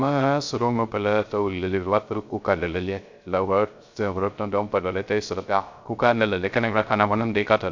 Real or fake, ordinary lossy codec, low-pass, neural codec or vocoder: fake; MP3, 64 kbps; 7.2 kHz; codec, 16 kHz, 0.3 kbps, FocalCodec